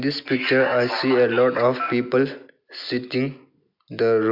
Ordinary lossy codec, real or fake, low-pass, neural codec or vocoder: MP3, 48 kbps; real; 5.4 kHz; none